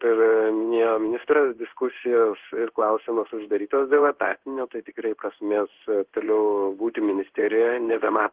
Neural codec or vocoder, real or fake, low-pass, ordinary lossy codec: codec, 16 kHz in and 24 kHz out, 1 kbps, XY-Tokenizer; fake; 3.6 kHz; Opus, 16 kbps